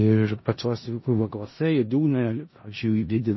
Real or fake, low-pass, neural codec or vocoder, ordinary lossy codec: fake; 7.2 kHz; codec, 16 kHz in and 24 kHz out, 0.4 kbps, LongCat-Audio-Codec, four codebook decoder; MP3, 24 kbps